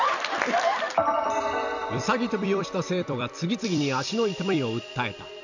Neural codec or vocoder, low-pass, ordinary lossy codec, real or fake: vocoder, 44.1 kHz, 128 mel bands every 256 samples, BigVGAN v2; 7.2 kHz; none; fake